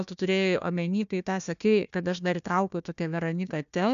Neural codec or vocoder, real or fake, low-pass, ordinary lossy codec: codec, 16 kHz, 1 kbps, FunCodec, trained on Chinese and English, 50 frames a second; fake; 7.2 kHz; MP3, 96 kbps